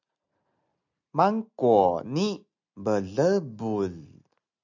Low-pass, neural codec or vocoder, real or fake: 7.2 kHz; none; real